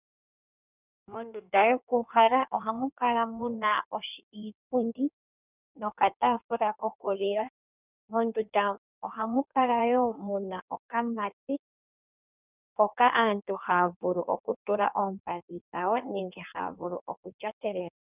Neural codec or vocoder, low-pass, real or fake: codec, 16 kHz in and 24 kHz out, 1.1 kbps, FireRedTTS-2 codec; 3.6 kHz; fake